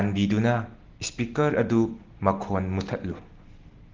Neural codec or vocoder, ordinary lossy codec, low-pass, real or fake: none; Opus, 16 kbps; 7.2 kHz; real